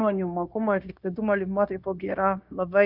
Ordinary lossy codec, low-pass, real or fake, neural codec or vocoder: Opus, 64 kbps; 5.4 kHz; fake; codec, 16 kHz, 0.9 kbps, LongCat-Audio-Codec